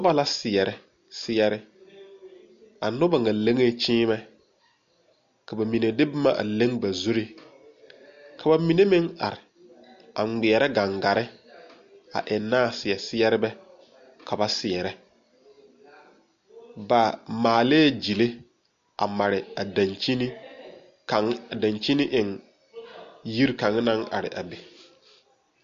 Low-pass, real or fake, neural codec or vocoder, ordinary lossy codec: 7.2 kHz; real; none; MP3, 48 kbps